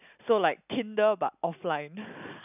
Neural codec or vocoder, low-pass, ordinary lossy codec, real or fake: none; 3.6 kHz; none; real